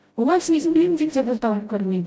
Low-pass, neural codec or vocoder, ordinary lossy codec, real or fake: none; codec, 16 kHz, 0.5 kbps, FreqCodec, smaller model; none; fake